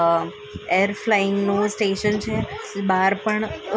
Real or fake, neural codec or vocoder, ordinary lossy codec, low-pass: real; none; none; none